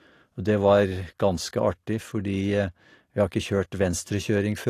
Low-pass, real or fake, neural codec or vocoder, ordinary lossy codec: 14.4 kHz; real; none; AAC, 48 kbps